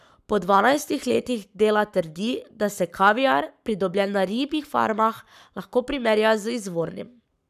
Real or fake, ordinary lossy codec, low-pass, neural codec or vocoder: fake; none; 14.4 kHz; codec, 44.1 kHz, 7.8 kbps, Pupu-Codec